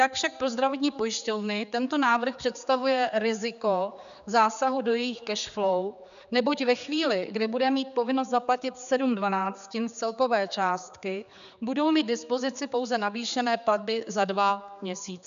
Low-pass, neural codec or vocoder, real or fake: 7.2 kHz; codec, 16 kHz, 4 kbps, X-Codec, HuBERT features, trained on general audio; fake